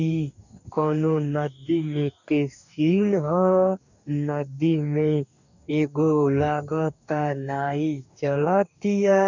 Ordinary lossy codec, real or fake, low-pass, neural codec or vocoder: none; fake; 7.2 kHz; codec, 44.1 kHz, 2.6 kbps, DAC